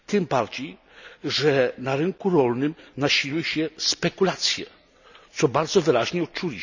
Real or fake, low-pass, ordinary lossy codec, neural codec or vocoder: real; 7.2 kHz; none; none